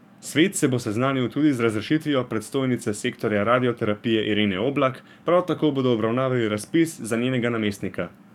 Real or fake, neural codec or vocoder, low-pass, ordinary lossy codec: fake; codec, 44.1 kHz, 7.8 kbps, DAC; 19.8 kHz; none